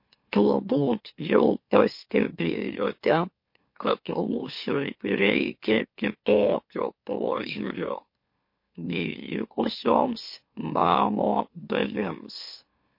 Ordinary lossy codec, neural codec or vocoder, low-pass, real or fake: MP3, 32 kbps; autoencoder, 44.1 kHz, a latent of 192 numbers a frame, MeloTTS; 5.4 kHz; fake